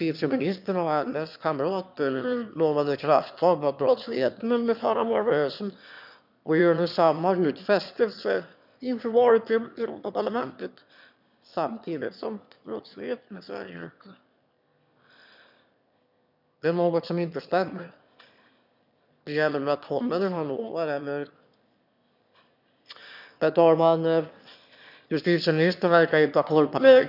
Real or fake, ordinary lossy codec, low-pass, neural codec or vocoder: fake; none; 5.4 kHz; autoencoder, 22.05 kHz, a latent of 192 numbers a frame, VITS, trained on one speaker